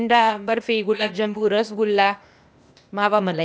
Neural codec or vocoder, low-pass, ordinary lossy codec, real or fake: codec, 16 kHz, 0.8 kbps, ZipCodec; none; none; fake